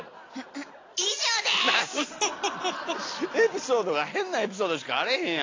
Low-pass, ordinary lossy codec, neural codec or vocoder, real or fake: 7.2 kHz; AAC, 32 kbps; none; real